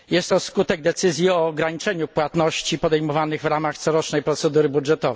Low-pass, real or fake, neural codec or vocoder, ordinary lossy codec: none; real; none; none